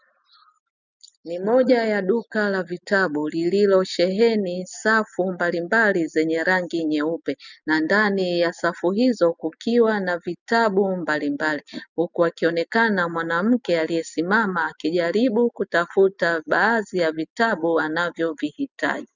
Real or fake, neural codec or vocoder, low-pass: real; none; 7.2 kHz